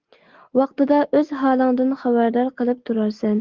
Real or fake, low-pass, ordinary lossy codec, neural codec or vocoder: real; 7.2 kHz; Opus, 16 kbps; none